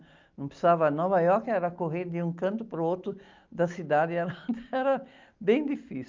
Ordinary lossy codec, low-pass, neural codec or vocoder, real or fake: Opus, 32 kbps; 7.2 kHz; none; real